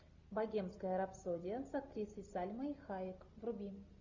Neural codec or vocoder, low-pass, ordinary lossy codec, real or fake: none; 7.2 kHz; Opus, 64 kbps; real